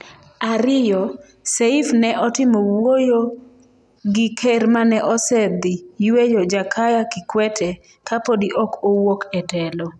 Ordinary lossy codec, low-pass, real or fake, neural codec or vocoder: none; none; real; none